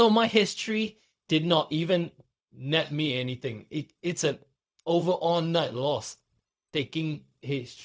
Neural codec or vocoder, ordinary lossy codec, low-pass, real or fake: codec, 16 kHz, 0.4 kbps, LongCat-Audio-Codec; none; none; fake